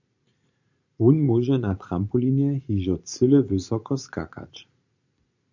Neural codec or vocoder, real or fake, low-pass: vocoder, 44.1 kHz, 80 mel bands, Vocos; fake; 7.2 kHz